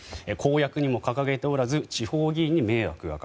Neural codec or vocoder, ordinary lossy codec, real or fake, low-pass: none; none; real; none